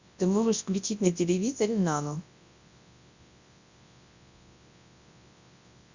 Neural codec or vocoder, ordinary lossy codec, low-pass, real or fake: codec, 24 kHz, 0.9 kbps, WavTokenizer, large speech release; Opus, 64 kbps; 7.2 kHz; fake